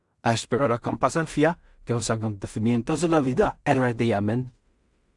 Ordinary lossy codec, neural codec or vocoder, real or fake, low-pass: Opus, 64 kbps; codec, 16 kHz in and 24 kHz out, 0.4 kbps, LongCat-Audio-Codec, two codebook decoder; fake; 10.8 kHz